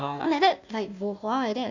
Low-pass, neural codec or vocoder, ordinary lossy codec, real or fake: 7.2 kHz; codec, 16 kHz, 1 kbps, FunCodec, trained on Chinese and English, 50 frames a second; none; fake